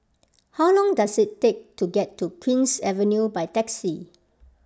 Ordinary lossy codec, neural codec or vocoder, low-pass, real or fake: none; none; none; real